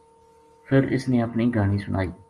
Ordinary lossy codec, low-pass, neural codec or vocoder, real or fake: Opus, 32 kbps; 10.8 kHz; none; real